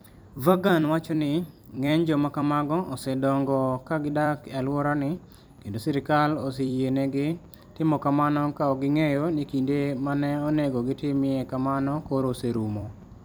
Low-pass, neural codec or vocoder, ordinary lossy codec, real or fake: none; vocoder, 44.1 kHz, 128 mel bands every 256 samples, BigVGAN v2; none; fake